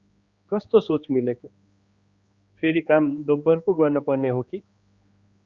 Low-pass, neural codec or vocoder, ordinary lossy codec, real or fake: 7.2 kHz; codec, 16 kHz, 2 kbps, X-Codec, HuBERT features, trained on general audio; Opus, 64 kbps; fake